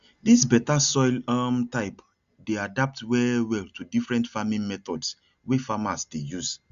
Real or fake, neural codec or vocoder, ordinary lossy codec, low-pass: real; none; Opus, 64 kbps; 7.2 kHz